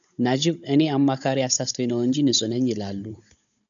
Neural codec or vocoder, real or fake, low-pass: codec, 16 kHz, 16 kbps, FunCodec, trained on Chinese and English, 50 frames a second; fake; 7.2 kHz